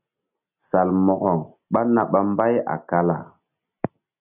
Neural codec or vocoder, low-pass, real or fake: none; 3.6 kHz; real